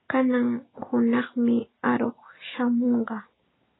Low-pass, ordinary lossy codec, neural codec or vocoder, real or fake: 7.2 kHz; AAC, 16 kbps; none; real